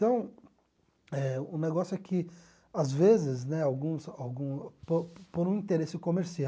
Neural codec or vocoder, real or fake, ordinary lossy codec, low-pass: none; real; none; none